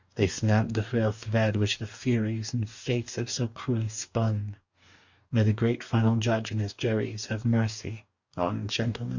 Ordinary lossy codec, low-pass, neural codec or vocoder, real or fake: Opus, 64 kbps; 7.2 kHz; codec, 44.1 kHz, 2.6 kbps, DAC; fake